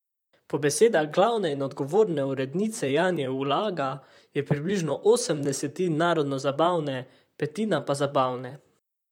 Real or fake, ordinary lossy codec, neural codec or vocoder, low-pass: fake; none; vocoder, 44.1 kHz, 128 mel bands, Pupu-Vocoder; 19.8 kHz